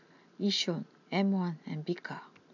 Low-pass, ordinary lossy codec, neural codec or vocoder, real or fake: 7.2 kHz; none; none; real